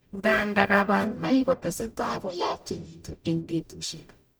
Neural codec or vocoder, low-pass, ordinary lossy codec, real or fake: codec, 44.1 kHz, 0.9 kbps, DAC; none; none; fake